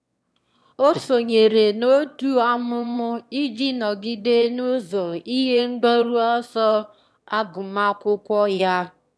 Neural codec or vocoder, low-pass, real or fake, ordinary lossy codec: autoencoder, 22.05 kHz, a latent of 192 numbers a frame, VITS, trained on one speaker; none; fake; none